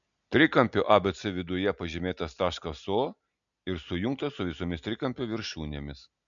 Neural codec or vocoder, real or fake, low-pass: none; real; 7.2 kHz